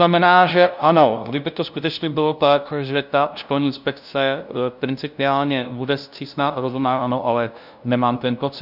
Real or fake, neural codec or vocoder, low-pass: fake; codec, 16 kHz, 0.5 kbps, FunCodec, trained on LibriTTS, 25 frames a second; 5.4 kHz